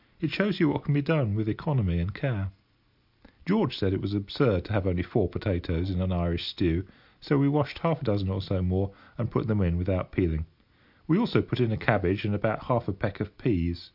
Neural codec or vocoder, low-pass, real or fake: none; 5.4 kHz; real